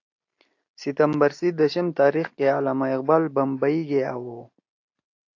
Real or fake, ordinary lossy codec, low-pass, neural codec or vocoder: real; AAC, 48 kbps; 7.2 kHz; none